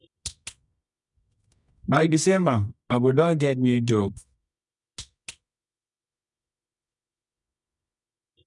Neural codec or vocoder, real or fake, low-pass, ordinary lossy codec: codec, 24 kHz, 0.9 kbps, WavTokenizer, medium music audio release; fake; 10.8 kHz; none